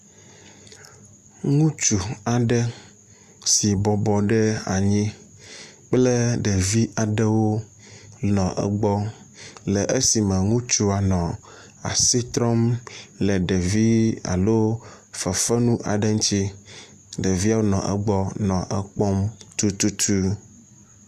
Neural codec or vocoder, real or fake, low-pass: none; real; 14.4 kHz